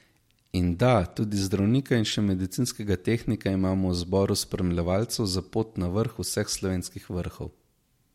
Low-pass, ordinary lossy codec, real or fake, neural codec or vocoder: 19.8 kHz; MP3, 64 kbps; real; none